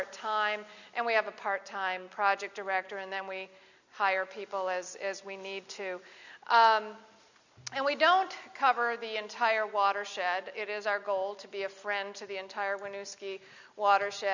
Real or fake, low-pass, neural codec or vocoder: real; 7.2 kHz; none